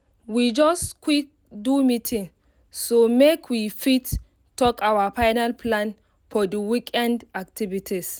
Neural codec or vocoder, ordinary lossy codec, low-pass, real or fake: none; none; none; real